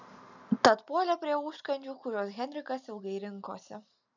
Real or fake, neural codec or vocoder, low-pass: real; none; 7.2 kHz